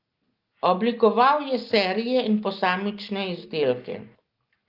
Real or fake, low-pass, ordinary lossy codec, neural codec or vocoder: real; 5.4 kHz; Opus, 32 kbps; none